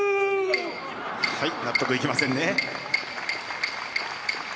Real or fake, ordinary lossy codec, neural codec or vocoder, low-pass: real; none; none; none